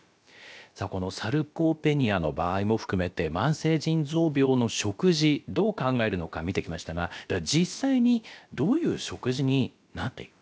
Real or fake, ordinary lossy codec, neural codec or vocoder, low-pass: fake; none; codec, 16 kHz, about 1 kbps, DyCAST, with the encoder's durations; none